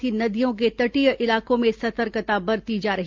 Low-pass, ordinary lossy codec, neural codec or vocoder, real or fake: 7.2 kHz; Opus, 24 kbps; none; real